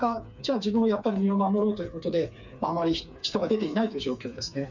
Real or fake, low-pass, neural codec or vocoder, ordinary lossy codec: fake; 7.2 kHz; codec, 16 kHz, 4 kbps, FreqCodec, smaller model; none